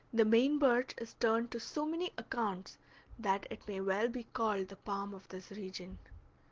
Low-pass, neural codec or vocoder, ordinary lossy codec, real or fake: 7.2 kHz; none; Opus, 16 kbps; real